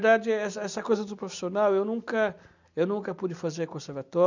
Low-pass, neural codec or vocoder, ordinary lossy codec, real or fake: 7.2 kHz; none; none; real